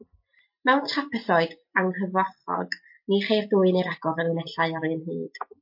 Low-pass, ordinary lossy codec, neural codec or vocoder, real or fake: 5.4 kHz; MP3, 32 kbps; none; real